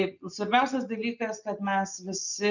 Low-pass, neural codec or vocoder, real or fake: 7.2 kHz; none; real